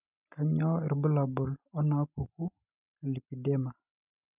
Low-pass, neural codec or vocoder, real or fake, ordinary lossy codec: 3.6 kHz; none; real; none